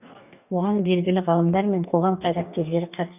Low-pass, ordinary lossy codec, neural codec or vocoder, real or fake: 3.6 kHz; none; codec, 44.1 kHz, 2.6 kbps, DAC; fake